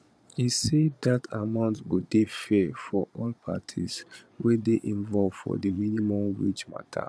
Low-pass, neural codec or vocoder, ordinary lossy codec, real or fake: none; vocoder, 22.05 kHz, 80 mel bands, Vocos; none; fake